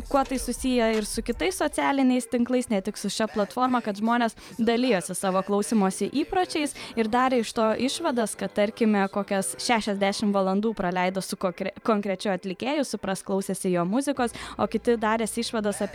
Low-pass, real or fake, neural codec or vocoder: 19.8 kHz; fake; vocoder, 44.1 kHz, 128 mel bands every 512 samples, BigVGAN v2